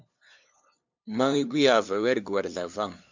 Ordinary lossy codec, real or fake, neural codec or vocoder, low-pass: MP3, 64 kbps; fake; codec, 16 kHz, 2 kbps, FunCodec, trained on LibriTTS, 25 frames a second; 7.2 kHz